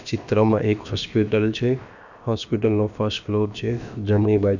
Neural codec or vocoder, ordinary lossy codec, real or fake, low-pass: codec, 16 kHz, about 1 kbps, DyCAST, with the encoder's durations; none; fake; 7.2 kHz